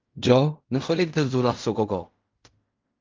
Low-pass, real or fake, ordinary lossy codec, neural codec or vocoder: 7.2 kHz; fake; Opus, 24 kbps; codec, 16 kHz in and 24 kHz out, 0.4 kbps, LongCat-Audio-Codec, fine tuned four codebook decoder